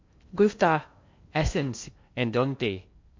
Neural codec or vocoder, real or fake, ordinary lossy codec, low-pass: codec, 16 kHz in and 24 kHz out, 0.6 kbps, FocalCodec, streaming, 2048 codes; fake; MP3, 48 kbps; 7.2 kHz